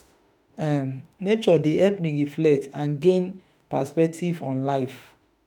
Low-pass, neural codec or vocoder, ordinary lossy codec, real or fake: none; autoencoder, 48 kHz, 32 numbers a frame, DAC-VAE, trained on Japanese speech; none; fake